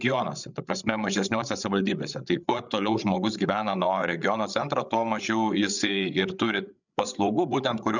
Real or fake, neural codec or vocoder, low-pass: fake; codec, 16 kHz, 8 kbps, FreqCodec, larger model; 7.2 kHz